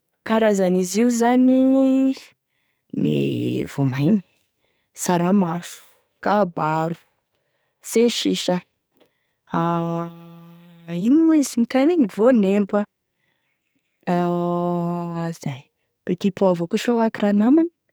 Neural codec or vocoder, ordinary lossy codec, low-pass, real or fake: codec, 44.1 kHz, 2.6 kbps, SNAC; none; none; fake